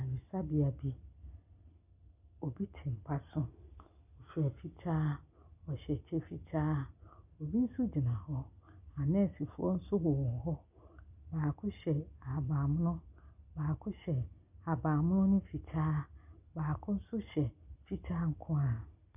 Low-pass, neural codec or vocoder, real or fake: 3.6 kHz; none; real